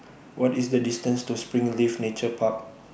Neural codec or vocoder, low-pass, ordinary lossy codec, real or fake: none; none; none; real